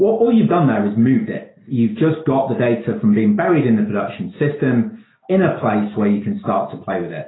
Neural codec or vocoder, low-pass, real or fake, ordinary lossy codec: none; 7.2 kHz; real; AAC, 16 kbps